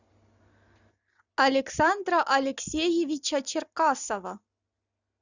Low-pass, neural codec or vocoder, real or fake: 7.2 kHz; none; real